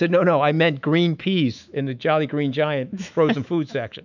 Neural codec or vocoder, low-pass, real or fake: autoencoder, 48 kHz, 128 numbers a frame, DAC-VAE, trained on Japanese speech; 7.2 kHz; fake